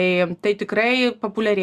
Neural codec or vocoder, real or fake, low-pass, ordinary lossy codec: none; real; 14.4 kHz; Opus, 64 kbps